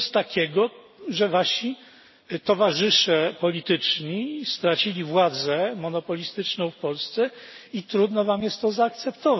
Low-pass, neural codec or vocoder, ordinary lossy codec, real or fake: 7.2 kHz; none; MP3, 24 kbps; real